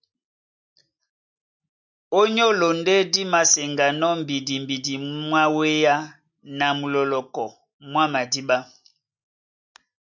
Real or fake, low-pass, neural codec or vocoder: real; 7.2 kHz; none